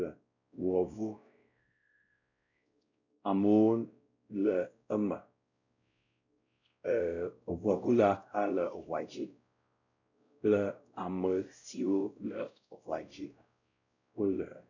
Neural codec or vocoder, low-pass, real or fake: codec, 16 kHz, 0.5 kbps, X-Codec, WavLM features, trained on Multilingual LibriSpeech; 7.2 kHz; fake